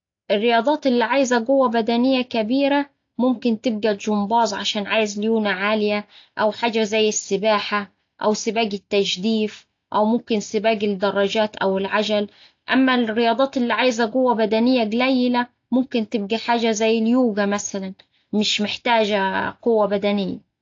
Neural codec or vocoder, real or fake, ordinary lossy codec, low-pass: none; real; AAC, 48 kbps; 7.2 kHz